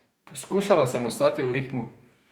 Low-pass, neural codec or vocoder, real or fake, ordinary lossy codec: 19.8 kHz; codec, 44.1 kHz, 2.6 kbps, DAC; fake; Opus, 64 kbps